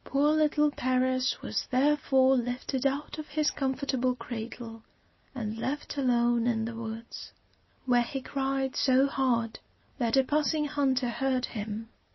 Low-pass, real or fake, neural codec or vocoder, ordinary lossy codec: 7.2 kHz; real; none; MP3, 24 kbps